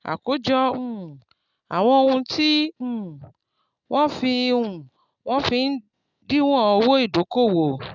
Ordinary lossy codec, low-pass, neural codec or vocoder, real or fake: none; 7.2 kHz; none; real